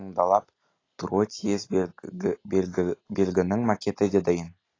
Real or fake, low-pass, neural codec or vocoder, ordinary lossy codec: real; 7.2 kHz; none; AAC, 32 kbps